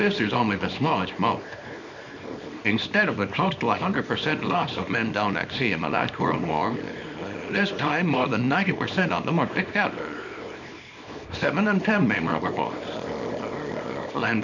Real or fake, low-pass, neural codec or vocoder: fake; 7.2 kHz; codec, 24 kHz, 0.9 kbps, WavTokenizer, small release